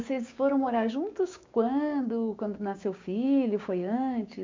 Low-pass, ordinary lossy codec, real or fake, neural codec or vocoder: 7.2 kHz; MP3, 64 kbps; fake; codec, 16 kHz, 6 kbps, DAC